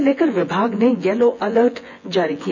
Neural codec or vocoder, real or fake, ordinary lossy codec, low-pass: vocoder, 24 kHz, 100 mel bands, Vocos; fake; none; 7.2 kHz